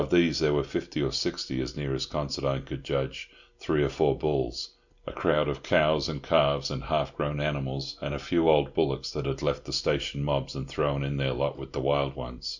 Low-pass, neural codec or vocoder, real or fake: 7.2 kHz; none; real